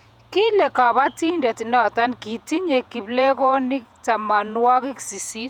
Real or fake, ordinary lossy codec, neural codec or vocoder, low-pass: fake; none; vocoder, 48 kHz, 128 mel bands, Vocos; 19.8 kHz